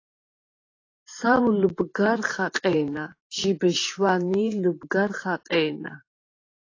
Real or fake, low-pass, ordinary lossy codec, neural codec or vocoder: fake; 7.2 kHz; AAC, 32 kbps; vocoder, 44.1 kHz, 128 mel bands every 512 samples, BigVGAN v2